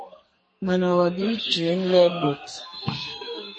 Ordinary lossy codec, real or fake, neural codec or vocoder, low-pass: MP3, 32 kbps; fake; codec, 44.1 kHz, 2.6 kbps, SNAC; 9.9 kHz